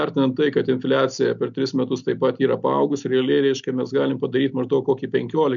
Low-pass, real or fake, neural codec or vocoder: 7.2 kHz; real; none